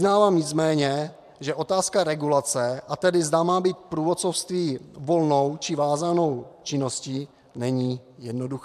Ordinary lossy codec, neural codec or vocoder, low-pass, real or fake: AAC, 96 kbps; vocoder, 44.1 kHz, 128 mel bands every 512 samples, BigVGAN v2; 14.4 kHz; fake